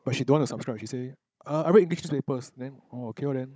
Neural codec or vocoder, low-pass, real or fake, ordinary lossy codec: codec, 16 kHz, 16 kbps, FunCodec, trained on Chinese and English, 50 frames a second; none; fake; none